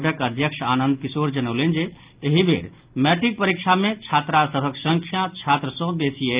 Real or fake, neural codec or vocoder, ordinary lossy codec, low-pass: real; none; Opus, 24 kbps; 3.6 kHz